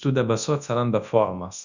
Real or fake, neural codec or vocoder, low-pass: fake; codec, 24 kHz, 0.9 kbps, WavTokenizer, large speech release; 7.2 kHz